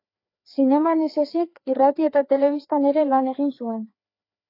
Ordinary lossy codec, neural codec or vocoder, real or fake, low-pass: AAC, 32 kbps; codec, 16 kHz, 2 kbps, FreqCodec, larger model; fake; 5.4 kHz